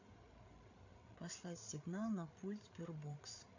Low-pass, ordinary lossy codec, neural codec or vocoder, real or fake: 7.2 kHz; AAC, 48 kbps; codec, 16 kHz, 8 kbps, FreqCodec, larger model; fake